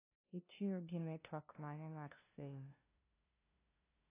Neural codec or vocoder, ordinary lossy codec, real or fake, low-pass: codec, 16 kHz, 0.5 kbps, FunCodec, trained on LibriTTS, 25 frames a second; AAC, 24 kbps; fake; 3.6 kHz